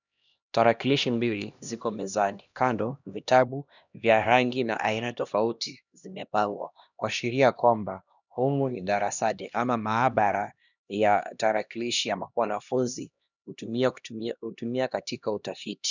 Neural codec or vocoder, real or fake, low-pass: codec, 16 kHz, 1 kbps, X-Codec, HuBERT features, trained on LibriSpeech; fake; 7.2 kHz